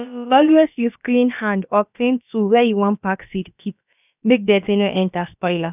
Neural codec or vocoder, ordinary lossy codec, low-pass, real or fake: codec, 16 kHz, about 1 kbps, DyCAST, with the encoder's durations; none; 3.6 kHz; fake